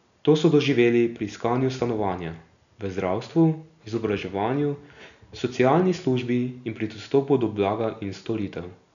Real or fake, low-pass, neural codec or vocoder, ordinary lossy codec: real; 7.2 kHz; none; none